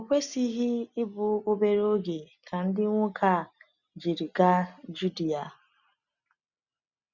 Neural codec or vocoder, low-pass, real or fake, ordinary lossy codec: none; 7.2 kHz; real; none